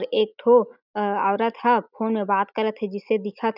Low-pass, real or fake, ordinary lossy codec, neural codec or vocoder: 5.4 kHz; real; none; none